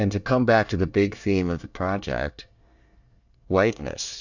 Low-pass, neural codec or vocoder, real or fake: 7.2 kHz; codec, 24 kHz, 1 kbps, SNAC; fake